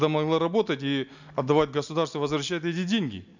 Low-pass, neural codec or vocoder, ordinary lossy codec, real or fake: 7.2 kHz; none; none; real